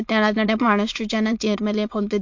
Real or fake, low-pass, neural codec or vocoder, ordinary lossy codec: fake; 7.2 kHz; autoencoder, 22.05 kHz, a latent of 192 numbers a frame, VITS, trained on many speakers; MP3, 48 kbps